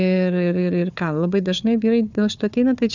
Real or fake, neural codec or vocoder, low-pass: fake; codec, 16 kHz, 4 kbps, FunCodec, trained on Chinese and English, 50 frames a second; 7.2 kHz